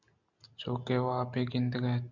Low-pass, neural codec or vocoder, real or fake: 7.2 kHz; none; real